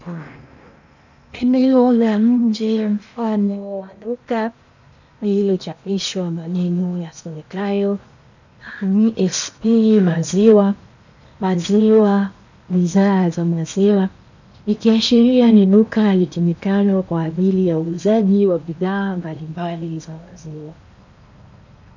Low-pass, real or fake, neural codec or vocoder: 7.2 kHz; fake; codec, 16 kHz in and 24 kHz out, 0.8 kbps, FocalCodec, streaming, 65536 codes